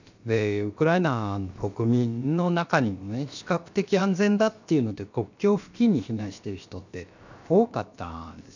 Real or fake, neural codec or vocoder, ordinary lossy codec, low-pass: fake; codec, 16 kHz, about 1 kbps, DyCAST, with the encoder's durations; none; 7.2 kHz